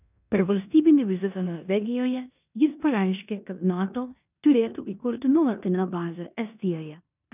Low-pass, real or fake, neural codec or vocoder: 3.6 kHz; fake; codec, 16 kHz in and 24 kHz out, 0.9 kbps, LongCat-Audio-Codec, four codebook decoder